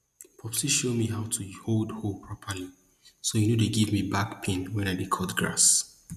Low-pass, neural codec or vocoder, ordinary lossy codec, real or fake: 14.4 kHz; none; none; real